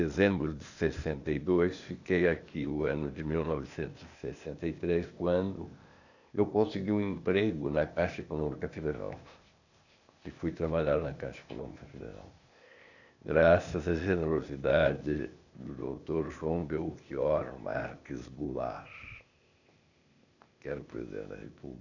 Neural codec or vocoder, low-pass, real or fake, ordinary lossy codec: codec, 16 kHz, 0.8 kbps, ZipCodec; 7.2 kHz; fake; none